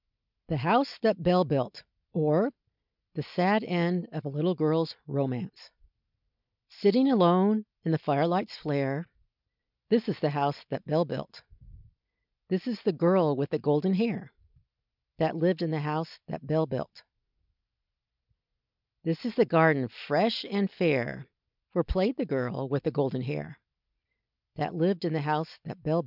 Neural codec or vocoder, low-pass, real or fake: none; 5.4 kHz; real